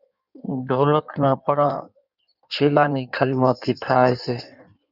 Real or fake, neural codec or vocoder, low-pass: fake; codec, 16 kHz in and 24 kHz out, 1.1 kbps, FireRedTTS-2 codec; 5.4 kHz